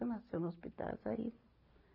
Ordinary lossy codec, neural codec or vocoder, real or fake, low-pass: none; none; real; 5.4 kHz